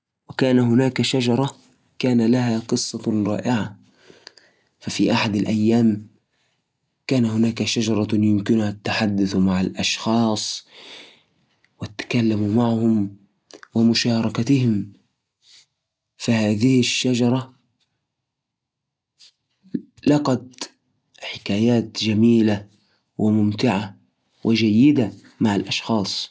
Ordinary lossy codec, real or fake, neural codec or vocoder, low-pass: none; real; none; none